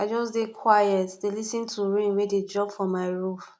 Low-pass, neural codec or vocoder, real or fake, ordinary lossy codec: none; none; real; none